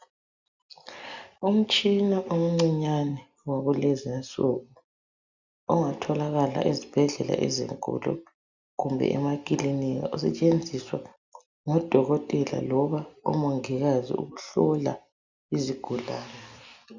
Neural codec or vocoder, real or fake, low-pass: none; real; 7.2 kHz